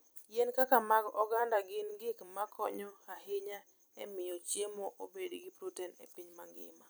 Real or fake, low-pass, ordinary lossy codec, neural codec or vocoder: fake; none; none; vocoder, 44.1 kHz, 128 mel bands every 256 samples, BigVGAN v2